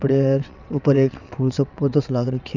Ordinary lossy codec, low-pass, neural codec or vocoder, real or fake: none; 7.2 kHz; vocoder, 22.05 kHz, 80 mel bands, WaveNeXt; fake